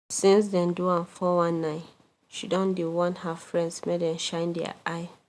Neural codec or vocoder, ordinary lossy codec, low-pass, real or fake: none; none; none; real